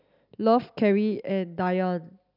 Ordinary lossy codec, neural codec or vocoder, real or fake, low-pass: none; none; real; 5.4 kHz